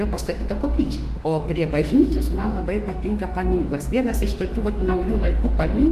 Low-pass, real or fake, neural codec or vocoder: 14.4 kHz; fake; autoencoder, 48 kHz, 32 numbers a frame, DAC-VAE, trained on Japanese speech